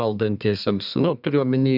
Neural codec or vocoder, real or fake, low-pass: codec, 32 kHz, 1.9 kbps, SNAC; fake; 5.4 kHz